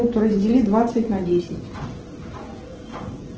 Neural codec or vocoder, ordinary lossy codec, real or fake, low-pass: none; Opus, 16 kbps; real; 7.2 kHz